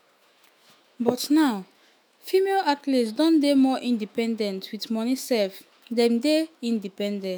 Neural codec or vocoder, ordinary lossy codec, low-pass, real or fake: autoencoder, 48 kHz, 128 numbers a frame, DAC-VAE, trained on Japanese speech; none; none; fake